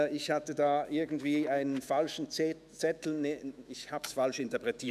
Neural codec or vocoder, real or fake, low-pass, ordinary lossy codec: autoencoder, 48 kHz, 128 numbers a frame, DAC-VAE, trained on Japanese speech; fake; 14.4 kHz; none